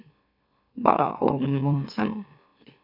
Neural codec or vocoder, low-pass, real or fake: autoencoder, 44.1 kHz, a latent of 192 numbers a frame, MeloTTS; 5.4 kHz; fake